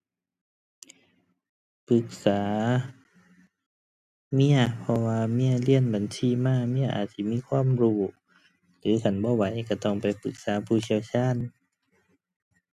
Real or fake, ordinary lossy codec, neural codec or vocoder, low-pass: real; none; none; 14.4 kHz